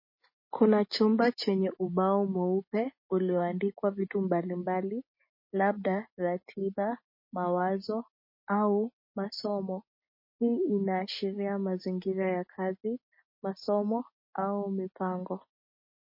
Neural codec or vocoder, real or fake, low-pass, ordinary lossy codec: none; real; 5.4 kHz; MP3, 24 kbps